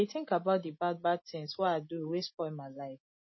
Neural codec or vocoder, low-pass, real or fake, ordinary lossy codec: none; 7.2 kHz; real; MP3, 24 kbps